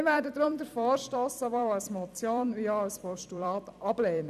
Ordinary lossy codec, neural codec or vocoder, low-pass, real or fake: none; vocoder, 44.1 kHz, 128 mel bands every 256 samples, BigVGAN v2; 14.4 kHz; fake